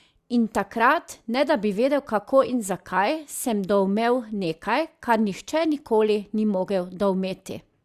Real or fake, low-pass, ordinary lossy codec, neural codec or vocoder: real; 14.4 kHz; Opus, 64 kbps; none